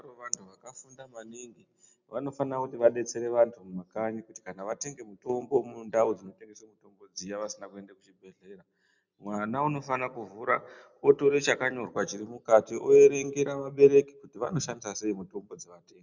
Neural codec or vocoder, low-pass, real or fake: none; 7.2 kHz; real